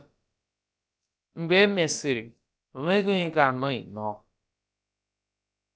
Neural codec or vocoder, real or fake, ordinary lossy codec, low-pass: codec, 16 kHz, about 1 kbps, DyCAST, with the encoder's durations; fake; none; none